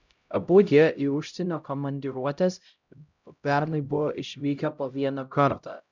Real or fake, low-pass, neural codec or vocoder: fake; 7.2 kHz; codec, 16 kHz, 0.5 kbps, X-Codec, HuBERT features, trained on LibriSpeech